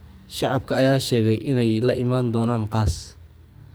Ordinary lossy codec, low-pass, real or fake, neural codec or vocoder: none; none; fake; codec, 44.1 kHz, 2.6 kbps, SNAC